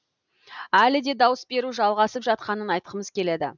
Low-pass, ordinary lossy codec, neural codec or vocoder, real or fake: 7.2 kHz; none; none; real